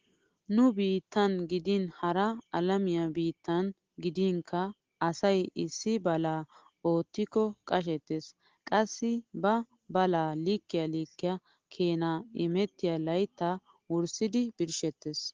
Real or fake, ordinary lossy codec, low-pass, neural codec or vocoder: real; Opus, 16 kbps; 7.2 kHz; none